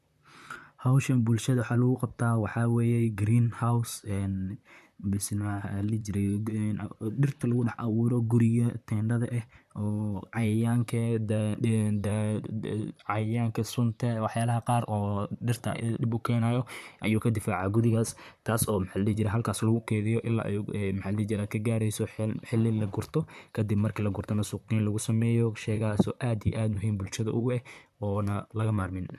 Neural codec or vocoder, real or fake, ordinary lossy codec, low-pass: vocoder, 44.1 kHz, 128 mel bands, Pupu-Vocoder; fake; none; 14.4 kHz